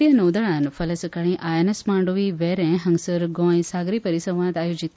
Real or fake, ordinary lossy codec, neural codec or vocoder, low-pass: real; none; none; none